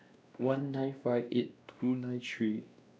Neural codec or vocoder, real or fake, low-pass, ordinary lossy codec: codec, 16 kHz, 1 kbps, X-Codec, WavLM features, trained on Multilingual LibriSpeech; fake; none; none